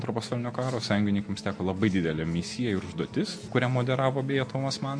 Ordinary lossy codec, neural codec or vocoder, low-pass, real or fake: MP3, 48 kbps; none; 9.9 kHz; real